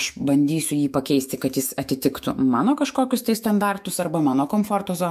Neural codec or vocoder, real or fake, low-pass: autoencoder, 48 kHz, 128 numbers a frame, DAC-VAE, trained on Japanese speech; fake; 14.4 kHz